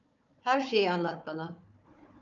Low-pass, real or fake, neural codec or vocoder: 7.2 kHz; fake; codec, 16 kHz, 4 kbps, FunCodec, trained on Chinese and English, 50 frames a second